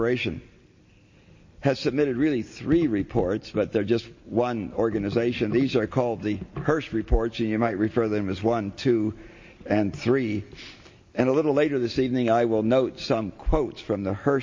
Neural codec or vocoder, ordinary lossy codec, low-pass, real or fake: none; MP3, 32 kbps; 7.2 kHz; real